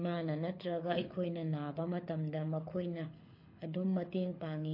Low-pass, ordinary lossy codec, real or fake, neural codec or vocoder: 5.4 kHz; MP3, 32 kbps; fake; codec, 24 kHz, 6 kbps, HILCodec